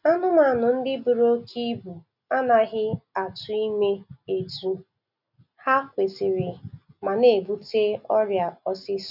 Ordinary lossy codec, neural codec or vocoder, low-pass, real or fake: none; none; 5.4 kHz; real